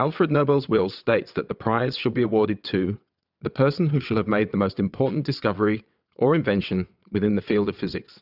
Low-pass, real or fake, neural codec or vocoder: 5.4 kHz; fake; vocoder, 22.05 kHz, 80 mel bands, WaveNeXt